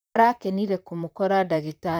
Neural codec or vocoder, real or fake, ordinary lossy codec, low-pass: vocoder, 44.1 kHz, 128 mel bands, Pupu-Vocoder; fake; none; none